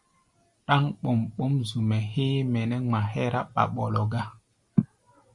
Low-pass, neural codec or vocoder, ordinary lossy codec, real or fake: 10.8 kHz; none; Opus, 64 kbps; real